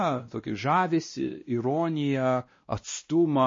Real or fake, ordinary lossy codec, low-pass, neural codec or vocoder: fake; MP3, 32 kbps; 7.2 kHz; codec, 16 kHz, 1 kbps, X-Codec, WavLM features, trained on Multilingual LibriSpeech